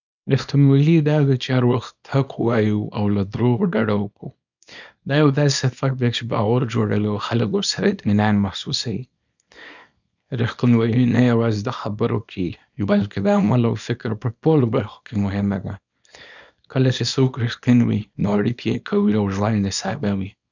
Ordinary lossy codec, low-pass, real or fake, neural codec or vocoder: none; 7.2 kHz; fake; codec, 24 kHz, 0.9 kbps, WavTokenizer, small release